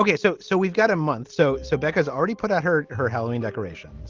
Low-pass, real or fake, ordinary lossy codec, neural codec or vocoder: 7.2 kHz; real; Opus, 32 kbps; none